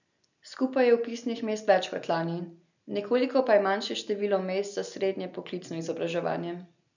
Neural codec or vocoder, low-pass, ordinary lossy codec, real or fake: none; 7.2 kHz; none; real